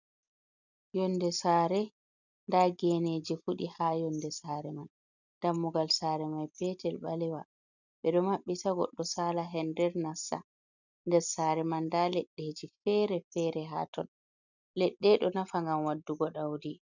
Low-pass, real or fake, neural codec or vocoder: 7.2 kHz; real; none